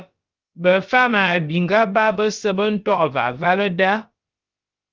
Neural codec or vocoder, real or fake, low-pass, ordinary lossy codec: codec, 16 kHz, about 1 kbps, DyCAST, with the encoder's durations; fake; 7.2 kHz; Opus, 32 kbps